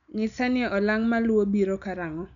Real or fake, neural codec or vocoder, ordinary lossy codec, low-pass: real; none; none; 7.2 kHz